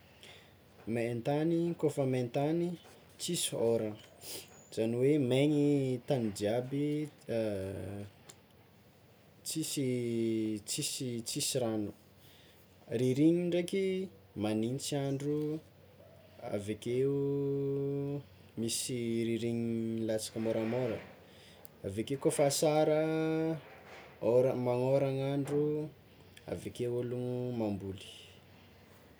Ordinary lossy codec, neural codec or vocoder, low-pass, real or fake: none; none; none; real